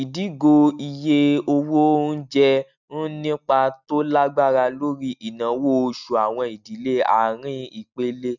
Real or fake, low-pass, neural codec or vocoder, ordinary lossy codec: real; 7.2 kHz; none; none